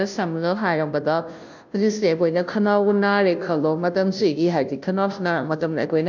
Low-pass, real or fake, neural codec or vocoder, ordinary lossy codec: 7.2 kHz; fake; codec, 16 kHz, 0.5 kbps, FunCodec, trained on Chinese and English, 25 frames a second; none